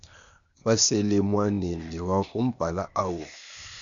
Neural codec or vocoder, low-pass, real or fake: codec, 16 kHz, 0.8 kbps, ZipCodec; 7.2 kHz; fake